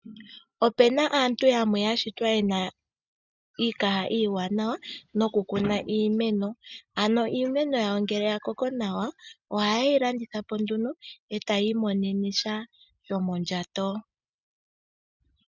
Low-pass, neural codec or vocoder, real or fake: 7.2 kHz; none; real